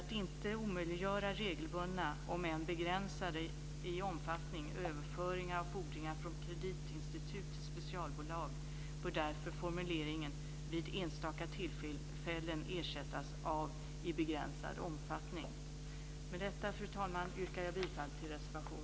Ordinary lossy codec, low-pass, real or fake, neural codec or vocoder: none; none; real; none